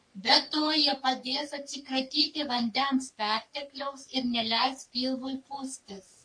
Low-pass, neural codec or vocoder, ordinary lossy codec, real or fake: 9.9 kHz; codec, 44.1 kHz, 3.4 kbps, Pupu-Codec; MP3, 48 kbps; fake